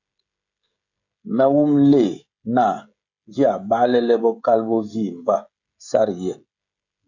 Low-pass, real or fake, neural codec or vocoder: 7.2 kHz; fake; codec, 16 kHz, 16 kbps, FreqCodec, smaller model